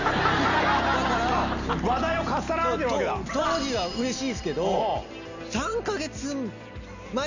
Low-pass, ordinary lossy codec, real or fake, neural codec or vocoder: 7.2 kHz; none; real; none